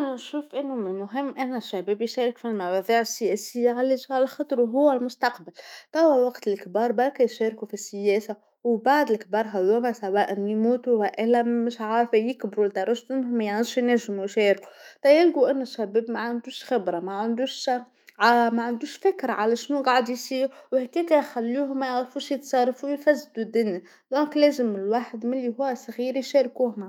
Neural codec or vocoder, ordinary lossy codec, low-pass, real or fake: autoencoder, 48 kHz, 128 numbers a frame, DAC-VAE, trained on Japanese speech; none; 19.8 kHz; fake